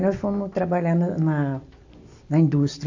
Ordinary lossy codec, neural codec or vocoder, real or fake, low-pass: AAC, 48 kbps; none; real; 7.2 kHz